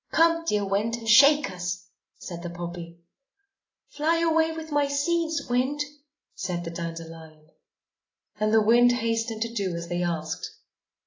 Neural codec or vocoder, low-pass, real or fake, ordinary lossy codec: none; 7.2 kHz; real; AAC, 32 kbps